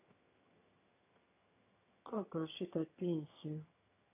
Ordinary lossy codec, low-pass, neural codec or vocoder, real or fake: AAC, 24 kbps; 3.6 kHz; vocoder, 22.05 kHz, 80 mel bands, HiFi-GAN; fake